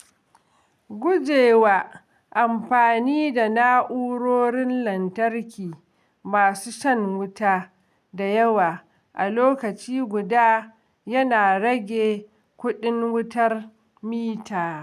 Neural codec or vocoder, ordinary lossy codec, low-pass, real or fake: none; none; 14.4 kHz; real